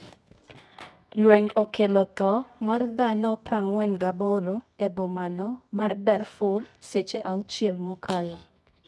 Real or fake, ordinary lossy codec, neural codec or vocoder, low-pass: fake; none; codec, 24 kHz, 0.9 kbps, WavTokenizer, medium music audio release; none